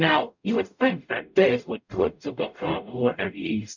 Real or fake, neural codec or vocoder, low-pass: fake; codec, 44.1 kHz, 0.9 kbps, DAC; 7.2 kHz